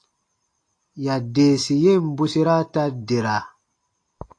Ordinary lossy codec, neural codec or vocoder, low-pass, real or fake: AAC, 48 kbps; none; 9.9 kHz; real